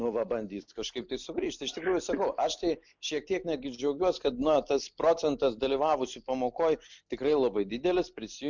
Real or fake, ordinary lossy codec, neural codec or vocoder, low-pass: real; MP3, 64 kbps; none; 7.2 kHz